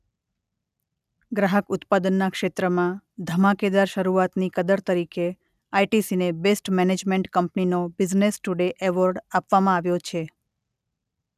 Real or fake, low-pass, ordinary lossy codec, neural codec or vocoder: real; 14.4 kHz; none; none